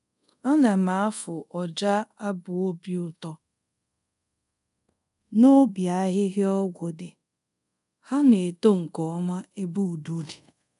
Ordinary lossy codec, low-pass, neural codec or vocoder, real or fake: none; 10.8 kHz; codec, 24 kHz, 0.5 kbps, DualCodec; fake